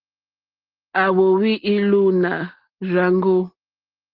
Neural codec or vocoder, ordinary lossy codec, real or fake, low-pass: none; Opus, 16 kbps; real; 5.4 kHz